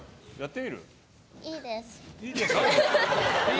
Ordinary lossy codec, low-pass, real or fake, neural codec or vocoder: none; none; real; none